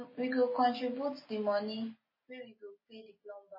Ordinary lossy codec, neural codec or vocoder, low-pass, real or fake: MP3, 24 kbps; none; 5.4 kHz; real